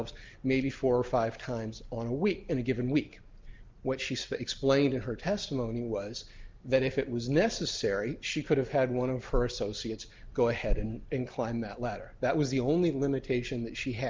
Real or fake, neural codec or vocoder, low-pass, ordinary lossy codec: real; none; 7.2 kHz; Opus, 24 kbps